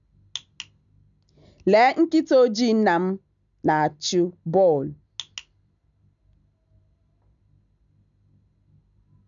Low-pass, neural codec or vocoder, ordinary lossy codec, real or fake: 7.2 kHz; none; none; real